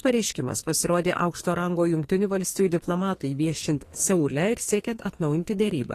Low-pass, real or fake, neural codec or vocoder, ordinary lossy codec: 14.4 kHz; fake; codec, 44.1 kHz, 2.6 kbps, SNAC; AAC, 48 kbps